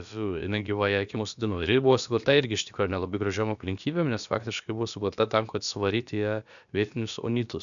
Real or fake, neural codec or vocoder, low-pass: fake; codec, 16 kHz, about 1 kbps, DyCAST, with the encoder's durations; 7.2 kHz